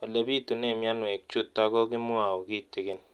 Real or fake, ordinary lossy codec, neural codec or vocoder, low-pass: real; Opus, 32 kbps; none; 19.8 kHz